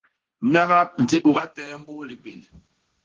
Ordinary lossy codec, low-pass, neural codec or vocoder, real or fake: Opus, 16 kbps; 7.2 kHz; codec, 16 kHz, 1.1 kbps, Voila-Tokenizer; fake